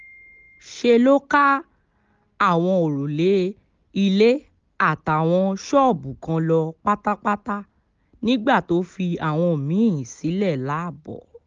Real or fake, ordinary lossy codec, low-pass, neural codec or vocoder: real; Opus, 24 kbps; 7.2 kHz; none